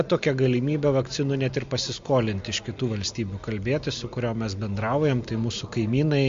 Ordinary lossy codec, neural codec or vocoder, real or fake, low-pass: MP3, 48 kbps; none; real; 7.2 kHz